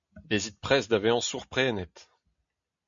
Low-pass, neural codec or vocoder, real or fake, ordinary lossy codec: 7.2 kHz; none; real; MP3, 64 kbps